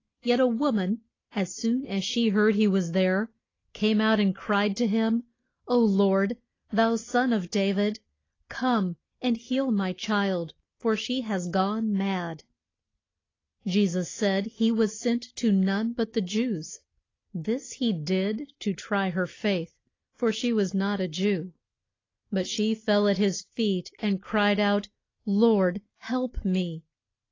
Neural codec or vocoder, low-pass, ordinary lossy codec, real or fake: none; 7.2 kHz; AAC, 32 kbps; real